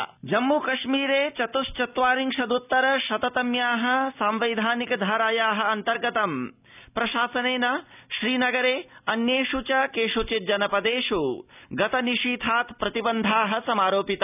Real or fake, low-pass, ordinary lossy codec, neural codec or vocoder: real; 3.6 kHz; none; none